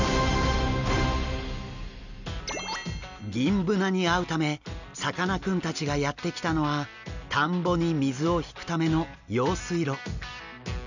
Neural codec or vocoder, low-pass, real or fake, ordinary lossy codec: none; 7.2 kHz; real; none